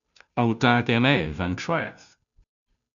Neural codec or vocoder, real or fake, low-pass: codec, 16 kHz, 0.5 kbps, FunCodec, trained on Chinese and English, 25 frames a second; fake; 7.2 kHz